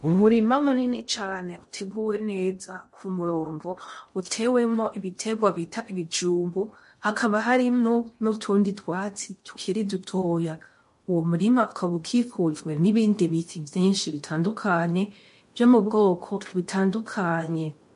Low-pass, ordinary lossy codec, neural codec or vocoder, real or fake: 10.8 kHz; MP3, 48 kbps; codec, 16 kHz in and 24 kHz out, 0.6 kbps, FocalCodec, streaming, 2048 codes; fake